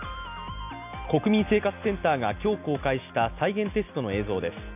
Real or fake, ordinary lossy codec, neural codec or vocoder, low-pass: real; none; none; 3.6 kHz